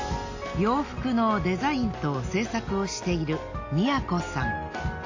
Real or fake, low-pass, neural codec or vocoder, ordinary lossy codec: real; 7.2 kHz; none; AAC, 48 kbps